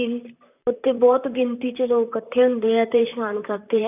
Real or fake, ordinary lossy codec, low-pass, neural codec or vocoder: fake; none; 3.6 kHz; vocoder, 44.1 kHz, 128 mel bands, Pupu-Vocoder